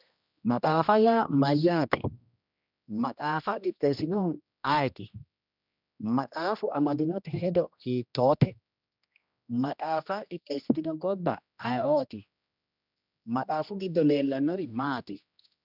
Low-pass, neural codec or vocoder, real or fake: 5.4 kHz; codec, 16 kHz, 1 kbps, X-Codec, HuBERT features, trained on general audio; fake